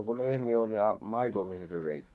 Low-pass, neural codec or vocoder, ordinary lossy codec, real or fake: none; codec, 24 kHz, 1 kbps, SNAC; none; fake